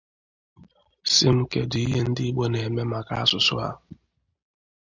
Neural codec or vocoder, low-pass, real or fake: none; 7.2 kHz; real